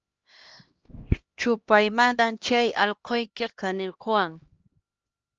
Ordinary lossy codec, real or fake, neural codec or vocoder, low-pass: Opus, 24 kbps; fake; codec, 16 kHz, 1 kbps, X-Codec, HuBERT features, trained on LibriSpeech; 7.2 kHz